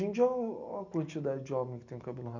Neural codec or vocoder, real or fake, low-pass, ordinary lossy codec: none; real; 7.2 kHz; none